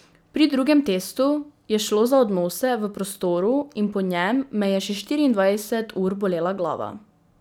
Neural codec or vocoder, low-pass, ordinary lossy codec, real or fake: none; none; none; real